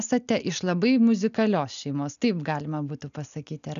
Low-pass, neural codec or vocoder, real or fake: 7.2 kHz; none; real